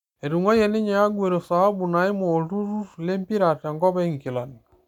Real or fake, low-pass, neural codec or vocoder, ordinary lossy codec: real; 19.8 kHz; none; none